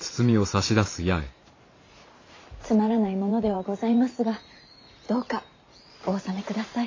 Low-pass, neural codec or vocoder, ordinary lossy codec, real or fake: 7.2 kHz; vocoder, 44.1 kHz, 128 mel bands every 512 samples, BigVGAN v2; AAC, 32 kbps; fake